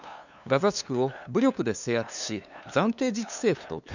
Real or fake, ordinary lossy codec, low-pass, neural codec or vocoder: fake; none; 7.2 kHz; codec, 16 kHz, 2 kbps, FunCodec, trained on LibriTTS, 25 frames a second